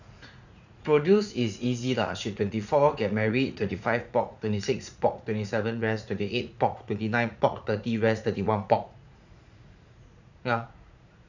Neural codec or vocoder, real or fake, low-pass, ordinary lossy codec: vocoder, 44.1 kHz, 80 mel bands, Vocos; fake; 7.2 kHz; none